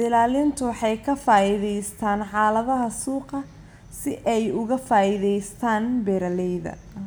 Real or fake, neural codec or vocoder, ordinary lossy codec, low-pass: real; none; none; none